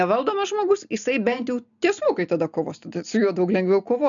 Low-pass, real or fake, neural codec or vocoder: 7.2 kHz; real; none